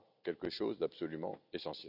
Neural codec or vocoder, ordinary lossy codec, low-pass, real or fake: none; none; 5.4 kHz; real